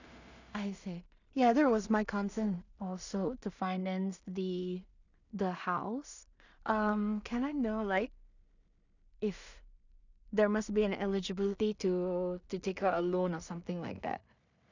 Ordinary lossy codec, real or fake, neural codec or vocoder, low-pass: none; fake; codec, 16 kHz in and 24 kHz out, 0.4 kbps, LongCat-Audio-Codec, two codebook decoder; 7.2 kHz